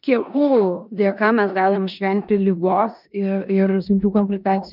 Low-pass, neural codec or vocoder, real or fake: 5.4 kHz; codec, 16 kHz in and 24 kHz out, 0.9 kbps, LongCat-Audio-Codec, four codebook decoder; fake